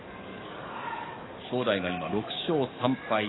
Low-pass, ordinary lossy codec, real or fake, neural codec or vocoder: 7.2 kHz; AAC, 16 kbps; fake; codec, 44.1 kHz, 7.8 kbps, DAC